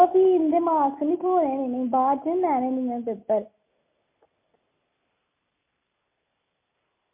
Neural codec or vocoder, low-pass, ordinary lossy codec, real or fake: none; 3.6 kHz; MP3, 24 kbps; real